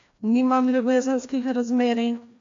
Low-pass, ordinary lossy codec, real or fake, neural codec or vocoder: 7.2 kHz; AAC, 48 kbps; fake; codec, 16 kHz, 1 kbps, FreqCodec, larger model